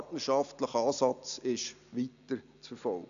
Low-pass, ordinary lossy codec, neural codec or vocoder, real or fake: 7.2 kHz; none; none; real